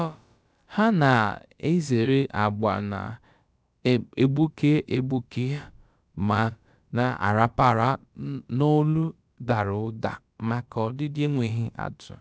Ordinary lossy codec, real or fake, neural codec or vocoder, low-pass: none; fake; codec, 16 kHz, about 1 kbps, DyCAST, with the encoder's durations; none